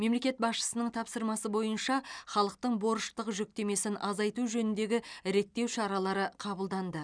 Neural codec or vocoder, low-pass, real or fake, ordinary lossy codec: none; 9.9 kHz; real; none